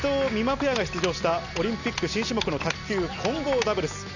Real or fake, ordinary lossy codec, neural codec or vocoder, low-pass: real; none; none; 7.2 kHz